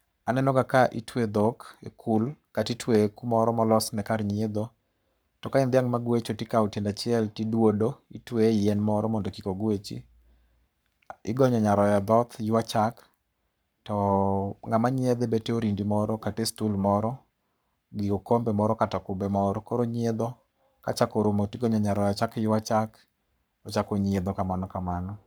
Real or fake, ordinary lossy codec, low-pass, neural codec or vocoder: fake; none; none; codec, 44.1 kHz, 7.8 kbps, Pupu-Codec